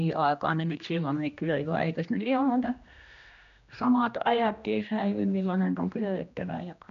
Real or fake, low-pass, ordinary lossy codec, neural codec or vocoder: fake; 7.2 kHz; MP3, 96 kbps; codec, 16 kHz, 1 kbps, X-Codec, HuBERT features, trained on general audio